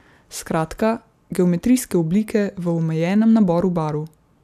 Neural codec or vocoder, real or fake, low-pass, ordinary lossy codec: none; real; 14.4 kHz; none